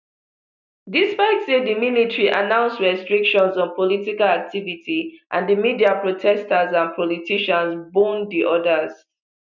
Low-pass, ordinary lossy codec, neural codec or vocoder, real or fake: 7.2 kHz; none; none; real